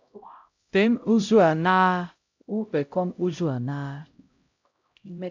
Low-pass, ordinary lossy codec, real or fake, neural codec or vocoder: 7.2 kHz; AAC, 48 kbps; fake; codec, 16 kHz, 0.5 kbps, X-Codec, HuBERT features, trained on LibriSpeech